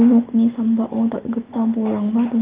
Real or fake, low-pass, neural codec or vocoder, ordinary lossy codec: real; 3.6 kHz; none; Opus, 24 kbps